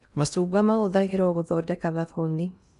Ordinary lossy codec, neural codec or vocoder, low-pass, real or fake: none; codec, 16 kHz in and 24 kHz out, 0.6 kbps, FocalCodec, streaming, 2048 codes; 10.8 kHz; fake